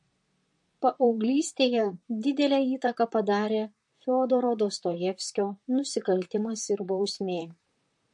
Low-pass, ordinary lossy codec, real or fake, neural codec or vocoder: 10.8 kHz; MP3, 48 kbps; fake; vocoder, 44.1 kHz, 128 mel bands, Pupu-Vocoder